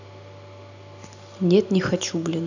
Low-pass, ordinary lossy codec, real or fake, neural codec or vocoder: 7.2 kHz; none; real; none